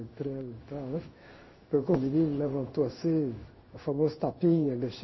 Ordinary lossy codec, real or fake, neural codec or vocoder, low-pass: MP3, 24 kbps; fake; codec, 16 kHz in and 24 kHz out, 1 kbps, XY-Tokenizer; 7.2 kHz